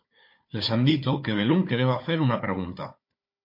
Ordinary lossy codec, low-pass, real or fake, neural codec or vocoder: MP3, 32 kbps; 5.4 kHz; fake; codec, 16 kHz, 4 kbps, FunCodec, trained on Chinese and English, 50 frames a second